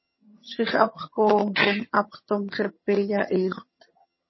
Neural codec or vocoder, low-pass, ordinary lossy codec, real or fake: vocoder, 22.05 kHz, 80 mel bands, HiFi-GAN; 7.2 kHz; MP3, 24 kbps; fake